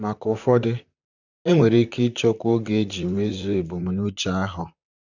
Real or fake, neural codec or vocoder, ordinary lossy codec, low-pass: fake; vocoder, 44.1 kHz, 128 mel bands, Pupu-Vocoder; none; 7.2 kHz